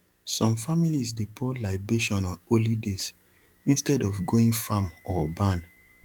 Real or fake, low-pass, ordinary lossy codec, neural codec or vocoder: fake; 19.8 kHz; none; codec, 44.1 kHz, 7.8 kbps, DAC